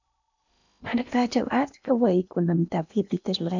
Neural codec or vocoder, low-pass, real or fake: codec, 16 kHz in and 24 kHz out, 0.8 kbps, FocalCodec, streaming, 65536 codes; 7.2 kHz; fake